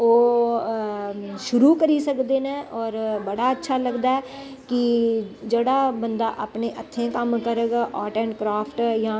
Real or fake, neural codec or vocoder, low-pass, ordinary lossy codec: real; none; none; none